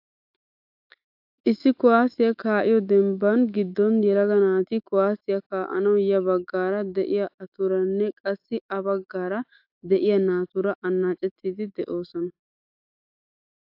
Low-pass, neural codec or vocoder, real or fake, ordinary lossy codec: 5.4 kHz; autoencoder, 48 kHz, 128 numbers a frame, DAC-VAE, trained on Japanese speech; fake; AAC, 48 kbps